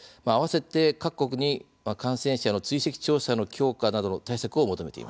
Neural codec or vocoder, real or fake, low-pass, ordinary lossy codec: none; real; none; none